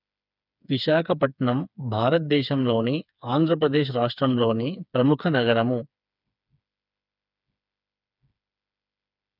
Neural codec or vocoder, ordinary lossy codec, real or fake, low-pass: codec, 16 kHz, 4 kbps, FreqCodec, smaller model; none; fake; 5.4 kHz